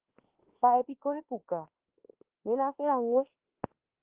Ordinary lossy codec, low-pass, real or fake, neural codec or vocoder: Opus, 16 kbps; 3.6 kHz; fake; autoencoder, 48 kHz, 32 numbers a frame, DAC-VAE, trained on Japanese speech